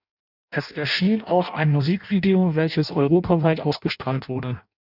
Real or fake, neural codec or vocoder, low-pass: fake; codec, 16 kHz in and 24 kHz out, 0.6 kbps, FireRedTTS-2 codec; 5.4 kHz